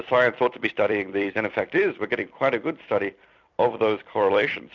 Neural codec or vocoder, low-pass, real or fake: vocoder, 44.1 kHz, 128 mel bands every 256 samples, BigVGAN v2; 7.2 kHz; fake